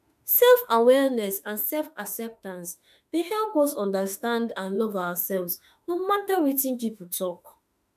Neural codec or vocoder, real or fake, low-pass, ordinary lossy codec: autoencoder, 48 kHz, 32 numbers a frame, DAC-VAE, trained on Japanese speech; fake; 14.4 kHz; none